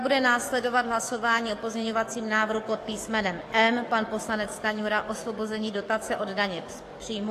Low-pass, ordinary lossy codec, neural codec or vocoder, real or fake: 14.4 kHz; AAC, 48 kbps; codec, 44.1 kHz, 7.8 kbps, DAC; fake